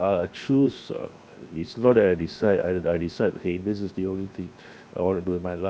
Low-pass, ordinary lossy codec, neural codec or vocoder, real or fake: none; none; codec, 16 kHz, 0.7 kbps, FocalCodec; fake